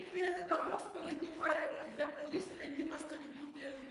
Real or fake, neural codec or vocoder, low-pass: fake; codec, 24 kHz, 1.5 kbps, HILCodec; 10.8 kHz